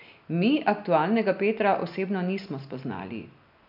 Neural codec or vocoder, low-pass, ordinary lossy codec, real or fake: none; 5.4 kHz; none; real